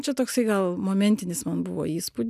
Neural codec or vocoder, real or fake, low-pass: none; real; 14.4 kHz